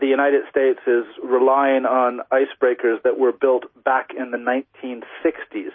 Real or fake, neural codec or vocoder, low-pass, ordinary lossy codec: real; none; 7.2 kHz; MP3, 24 kbps